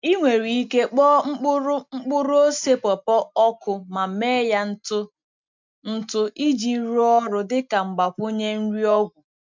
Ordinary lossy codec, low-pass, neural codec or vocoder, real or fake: MP3, 64 kbps; 7.2 kHz; none; real